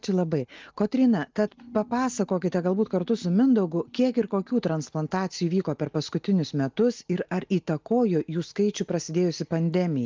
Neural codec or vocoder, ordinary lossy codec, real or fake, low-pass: none; Opus, 32 kbps; real; 7.2 kHz